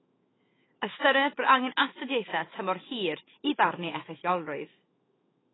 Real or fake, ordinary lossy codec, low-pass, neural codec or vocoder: fake; AAC, 16 kbps; 7.2 kHz; autoencoder, 48 kHz, 128 numbers a frame, DAC-VAE, trained on Japanese speech